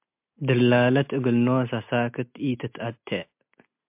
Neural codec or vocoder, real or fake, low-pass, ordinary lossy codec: none; real; 3.6 kHz; MP3, 32 kbps